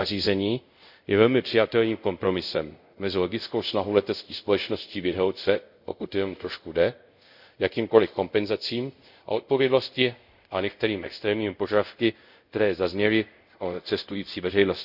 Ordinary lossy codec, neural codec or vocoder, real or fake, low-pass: none; codec, 24 kHz, 0.5 kbps, DualCodec; fake; 5.4 kHz